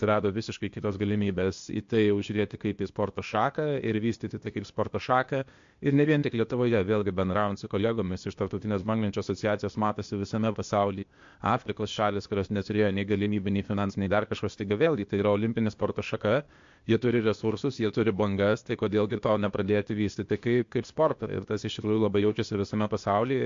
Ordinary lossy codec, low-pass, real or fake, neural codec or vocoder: MP3, 48 kbps; 7.2 kHz; fake; codec, 16 kHz, 0.8 kbps, ZipCodec